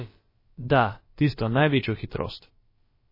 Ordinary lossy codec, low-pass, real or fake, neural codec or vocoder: MP3, 24 kbps; 5.4 kHz; fake; codec, 16 kHz, about 1 kbps, DyCAST, with the encoder's durations